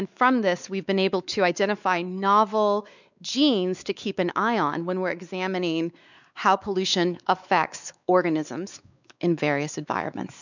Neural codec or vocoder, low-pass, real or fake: codec, 16 kHz, 4 kbps, X-Codec, WavLM features, trained on Multilingual LibriSpeech; 7.2 kHz; fake